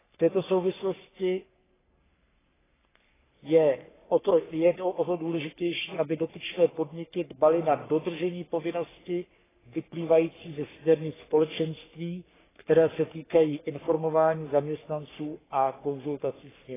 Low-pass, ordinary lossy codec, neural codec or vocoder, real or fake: 3.6 kHz; AAC, 16 kbps; codec, 44.1 kHz, 3.4 kbps, Pupu-Codec; fake